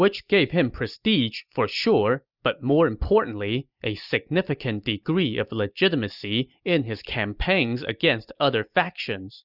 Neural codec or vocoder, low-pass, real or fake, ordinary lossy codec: none; 5.4 kHz; real; Opus, 64 kbps